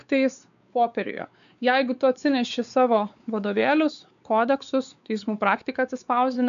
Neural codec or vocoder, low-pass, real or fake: codec, 16 kHz, 4 kbps, X-Codec, WavLM features, trained on Multilingual LibriSpeech; 7.2 kHz; fake